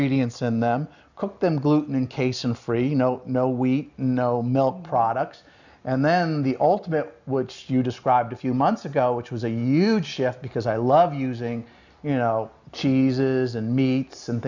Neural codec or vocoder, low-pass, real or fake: none; 7.2 kHz; real